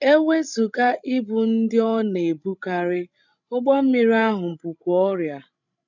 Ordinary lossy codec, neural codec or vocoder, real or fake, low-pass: none; codec, 16 kHz, 16 kbps, FreqCodec, larger model; fake; 7.2 kHz